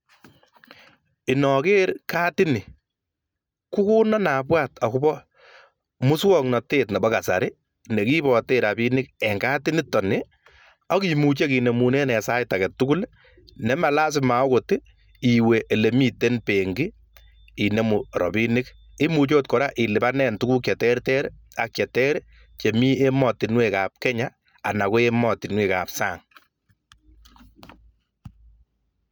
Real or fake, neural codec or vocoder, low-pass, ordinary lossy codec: real; none; none; none